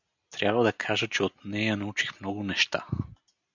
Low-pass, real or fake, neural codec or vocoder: 7.2 kHz; real; none